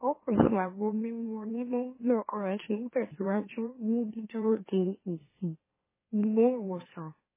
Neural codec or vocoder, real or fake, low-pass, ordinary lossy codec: autoencoder, 44.1 kHz, a latent of 192 numbers a frame, MeloTTS; fake; 3.6 kHz; MP3, 16 kbps